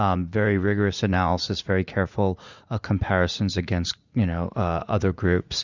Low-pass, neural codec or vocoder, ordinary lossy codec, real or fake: 7.2 kHz; vocoder, 44.1 kHz, 80 mel bands, Vocos; Opus, 64 kbps; fake